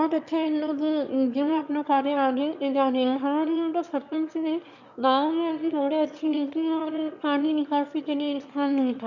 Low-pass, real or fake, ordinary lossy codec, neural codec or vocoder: 7.2 kHz; fake; none; autoencoder, 22.05 kHz, a latent of 192 numbers a frame, VITS, trained on one speaker